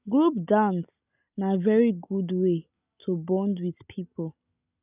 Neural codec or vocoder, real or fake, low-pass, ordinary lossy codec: none; real; 3.6 kHz; none